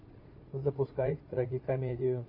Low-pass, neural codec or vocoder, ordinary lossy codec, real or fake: 5.4 kHz; vocoder, 44.1 kHz, 80 mel bands, Vocos; AAC, 48 kbps; fake